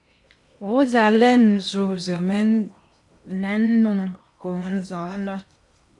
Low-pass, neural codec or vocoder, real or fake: 10.8 kHz; codec, 16 kHz in and 24 kHz out, 0.8 kbps, FocalCodec, streaming, 65536 codes; fake